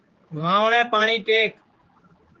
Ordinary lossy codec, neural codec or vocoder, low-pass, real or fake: Opus, 16 kbps; codec, 16 kHz, 4 kbps, X-Codec, HuBERT features, trained on general audio; 7.2 kHz; fake